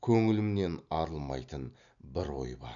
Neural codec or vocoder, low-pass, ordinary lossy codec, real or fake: none; 7.2 kHz; none; real